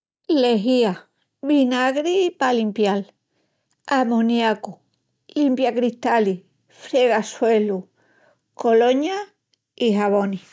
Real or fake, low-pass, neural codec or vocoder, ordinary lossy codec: real; none; none; none